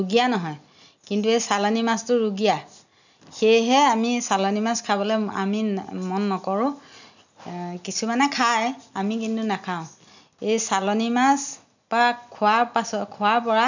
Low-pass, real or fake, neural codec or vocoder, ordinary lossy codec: 7.2 kHz; real; none; none